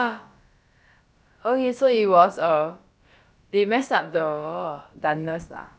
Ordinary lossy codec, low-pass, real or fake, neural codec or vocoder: none; none; fake; codec, 16 kHz, about 1 kbps, DyCAST, with the encoder's durations